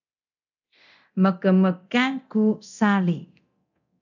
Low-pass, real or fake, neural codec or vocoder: 7.2 kHz; fake; codec, 24 kHz, 0.9 kbps, DualCodec